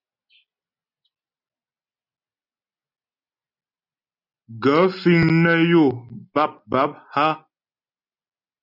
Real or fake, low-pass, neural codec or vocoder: real; 5.4 kHz; none